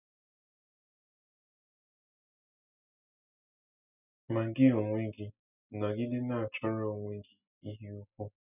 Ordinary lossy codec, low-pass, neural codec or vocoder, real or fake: none; 3.6 kHz; none; real